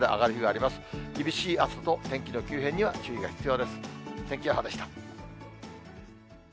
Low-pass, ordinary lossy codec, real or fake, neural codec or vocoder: none; none; real; none